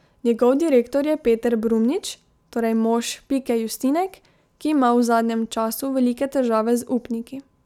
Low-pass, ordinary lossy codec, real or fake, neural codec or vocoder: 19.8 kHz; none; real; none